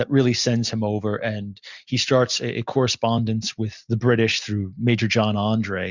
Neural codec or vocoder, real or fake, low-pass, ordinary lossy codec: none; real; 7.2 kHz; Opus, 64 kbps